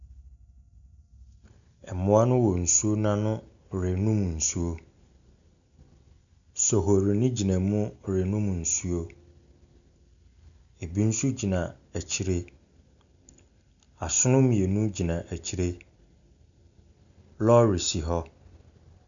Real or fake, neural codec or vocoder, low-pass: real; none; 7.2 kHz